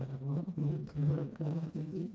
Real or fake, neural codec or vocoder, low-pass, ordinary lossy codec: fake; codec, 16 kHz, 1 kbps, FreqCodec, smaller model; none; none